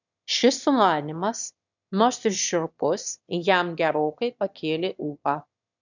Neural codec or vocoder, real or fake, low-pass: autoencoder, 22.05 kHz, a latent of 192 numbers a frame, VITS, trained on one speaker; fake; 7.2 kHz